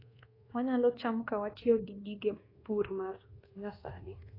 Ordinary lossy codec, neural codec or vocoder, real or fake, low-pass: AAC, 24 kbps; codec, 24 kHz, 1.2 kbps, DualCodec; fake; 5.4 kHz